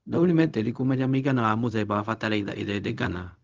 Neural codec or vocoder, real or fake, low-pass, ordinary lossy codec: codec, 16 kHz, 0.4 kbps, LongCat-Audio-Codec; fake; 7.2 kHz; Opus, 32 kbps